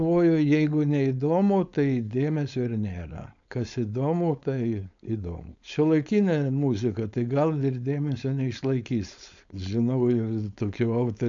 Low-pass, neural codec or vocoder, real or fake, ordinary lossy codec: 7.2 kHz; codec, 16 kHz, 4.8 kbps, FACodec; fake; AAC, 64 kbps